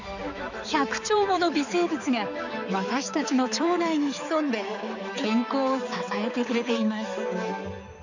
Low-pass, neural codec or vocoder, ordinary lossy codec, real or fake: 7.2 kHz; codec, 16 kHz, 4 kbps, X-Codec, HuBERT features, trained on balanced general audio; none; fake